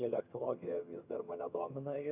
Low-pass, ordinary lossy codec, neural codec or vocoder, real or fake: 3.6 kHz; AAC, 24 kbps; vocoder, 22.05 kHz, 80 mel bands, HiFi-GAN; fake